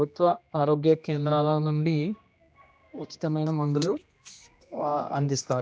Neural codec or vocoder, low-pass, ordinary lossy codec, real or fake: codec, 16 kHz, 1 kbps, X-Codec, HuBERT features, trained on general audio; none; none; fake